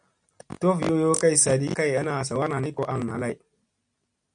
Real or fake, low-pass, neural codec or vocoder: real; 9.9 kHz; none